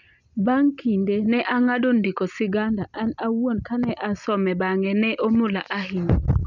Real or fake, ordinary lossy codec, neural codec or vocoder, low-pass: real; none; none; 7.2 kHz